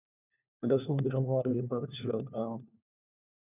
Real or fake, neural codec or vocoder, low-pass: fake; codec, 16 kHz, 1 kbps, FunCodec, trained on LibriTTS, 50 frames a second; 3.6 kHz